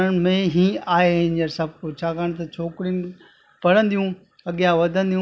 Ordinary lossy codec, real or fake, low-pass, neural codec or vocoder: none; real; none; none